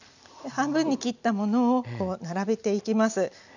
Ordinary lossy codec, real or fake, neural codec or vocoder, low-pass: none; real; none; 7.2 kHz